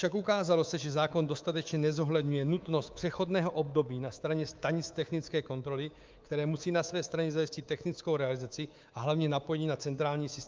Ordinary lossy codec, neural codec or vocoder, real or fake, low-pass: Opus, 24 kbps; codec, 24 kHz, 3.1 kbps, DualCodec; fake; 7.2 kHz